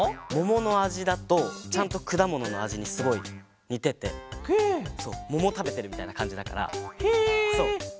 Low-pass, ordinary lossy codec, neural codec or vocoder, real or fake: none; none; none; real